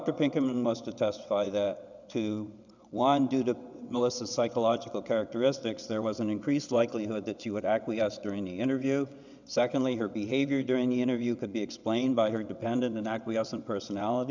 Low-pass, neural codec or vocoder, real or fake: 7.2 kHz; vocoder, 22.05 kHz, 80 mel bands, WaveNeXt; fake